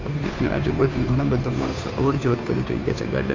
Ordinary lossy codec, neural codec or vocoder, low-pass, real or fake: AAC, 32 kbps; codec, 16 kHz, 2 kbps, FunCodec, trained on Chinese and English, 25 frames a second; 7.2 kHz; fake